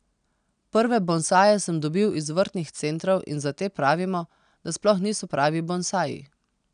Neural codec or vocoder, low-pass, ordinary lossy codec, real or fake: none; 9.9 kHz; none; real